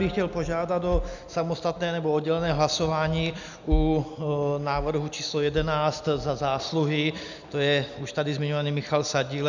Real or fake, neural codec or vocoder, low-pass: real; none; 7.2 kHz